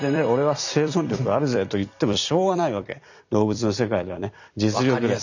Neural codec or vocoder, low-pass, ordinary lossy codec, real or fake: vocoder, 44.1 kHz, 128 mel bands every 256 samples, BigVGAN v2; 7.2 kHz; none; fake